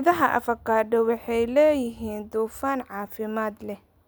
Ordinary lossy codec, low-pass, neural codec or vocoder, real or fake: none; none; none; real